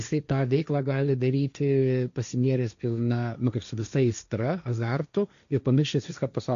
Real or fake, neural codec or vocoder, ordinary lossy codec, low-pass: fake; codec, 16 kHz, 1.1 kbps, Voila-Tokenizer; AAC, 96 kbps; 7.2 kHz